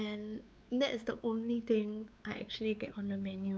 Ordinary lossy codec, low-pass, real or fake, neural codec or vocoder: none; none; fake; codec, 16 kHz, 4 kbps, X-Codec, WavLM features, trained on Multilingual LibriSpeech